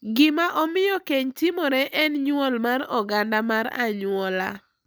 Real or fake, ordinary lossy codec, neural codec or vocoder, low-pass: real; none; none; none